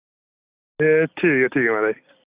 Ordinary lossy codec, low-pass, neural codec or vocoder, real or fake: Opus, 64 kbps; 3.6 kHz; none; real